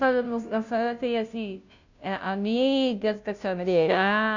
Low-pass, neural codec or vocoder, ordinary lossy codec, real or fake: 7.2 kHz; codec, 16 kHz, 0.5 kbps, FunCodec, trained on Chinese and English, 25 frames a second; none; fake